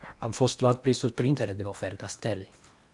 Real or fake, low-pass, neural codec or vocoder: fake; 10.8 kHz; codec, 16 kHz in and 24 kHz out, 0.8 kbps, FocalCodec, streaming, 65536 codes